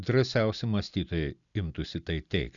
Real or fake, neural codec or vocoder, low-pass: real; none; 7.2 kHz